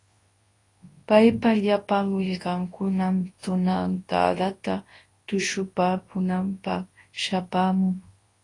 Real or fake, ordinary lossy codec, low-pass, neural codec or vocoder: fake; AAC, 32 kbps; 10.8 kHz; codec, 24 kHz, 0.9 kbps, WavTokenizer, large speech release